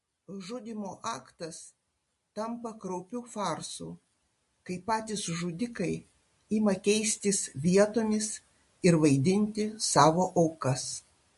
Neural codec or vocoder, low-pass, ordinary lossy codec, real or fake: none; 14.4 kHz; MP3, 48 kbps; real